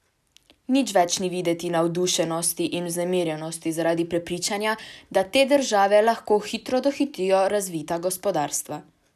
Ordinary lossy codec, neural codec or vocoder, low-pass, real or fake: none; none; 14.4 kHz; real